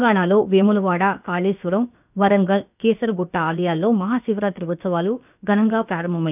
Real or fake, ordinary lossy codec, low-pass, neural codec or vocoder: fake; none; 3.6 kHz; codec, 16 kHz, about 1 kbps, DyCAST, with the encoder's durations